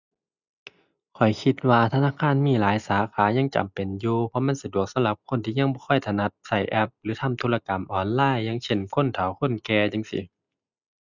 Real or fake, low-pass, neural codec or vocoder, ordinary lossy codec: real; 7.2 kHz; none; none